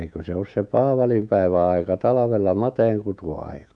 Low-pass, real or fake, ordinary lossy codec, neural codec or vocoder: 9.9 kHz; fake; none; autoencoder, 48 kHz, 128 numbers a frame, DAC-VAE, trained on Japanese speech